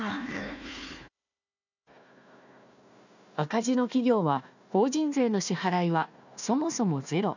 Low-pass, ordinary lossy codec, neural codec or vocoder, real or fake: 7.2 kHz; none; codec, 16 kHz, 1 kbps, FunCodec, trained on Chinese and English, 50 frames a second; fake